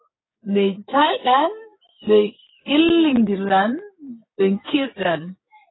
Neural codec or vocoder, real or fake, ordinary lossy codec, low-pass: codec, 16 kHz, 6 kbps, DAC; fake; AAC, 16 kbps; 7.2 kHz